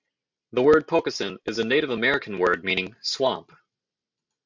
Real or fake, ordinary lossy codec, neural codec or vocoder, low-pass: real; MP3, 64 kbps; none; 7.2 kHz